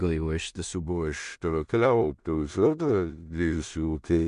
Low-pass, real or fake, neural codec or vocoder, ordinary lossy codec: 10.8 kHz; fake; codec, 16 kHz in and 24 kHz out, 0.4 kbps, LongCat-Audio-Codec, two codebook decoder; MP3, 64 kbps